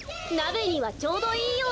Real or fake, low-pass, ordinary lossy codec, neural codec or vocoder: real; none; none; none